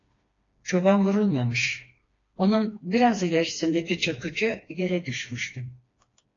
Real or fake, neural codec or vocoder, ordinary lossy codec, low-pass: fake; codec, 16 kHz, 2 kbps, FreqCodec, smaller model; AAC, 32 kbps; 7.2 kHz